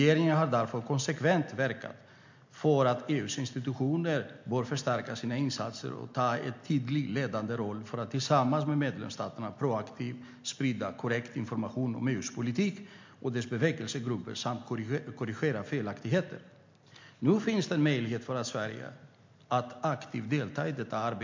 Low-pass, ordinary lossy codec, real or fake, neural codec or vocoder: 7.2 kHz; MP3, 48 kbps; real; none